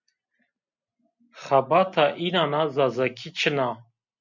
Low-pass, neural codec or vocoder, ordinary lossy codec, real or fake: 7.2 kHz; none; MP3, 64 kbps; real